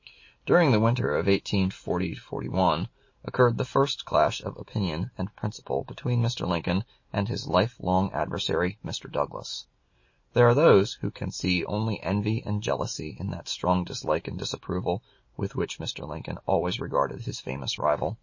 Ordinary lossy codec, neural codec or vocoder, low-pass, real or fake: MP3, 32 kbps; none; 7.2 kHz; real